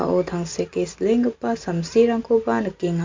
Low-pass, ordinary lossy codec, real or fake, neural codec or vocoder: 7.2 kHz; MP3, 48 kbps; real; none